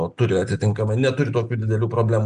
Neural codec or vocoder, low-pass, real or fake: none; 9.9 kHz; real